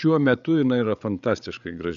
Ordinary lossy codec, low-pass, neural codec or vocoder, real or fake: MP3, 96 kbps; 7.2 kHz; codec, 16 kHz, 8 kbps, FreqCodec, larger model; fake